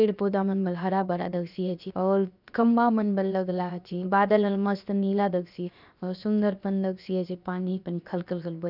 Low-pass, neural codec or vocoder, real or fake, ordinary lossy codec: 5.4 kHz; codec, 16 kHz, about 1 kbps, DyCAST, with the encoder's durations; fake; none